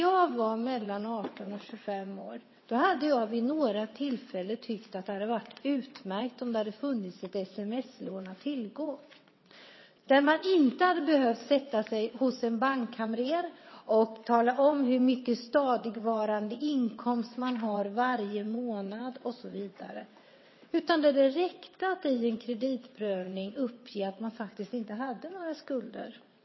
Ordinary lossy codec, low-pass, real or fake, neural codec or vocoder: MP3, 24 kbps; 7.2 kHz; fake; vocoder, 22.05 kHz, 80 mel bands, WaveNeXt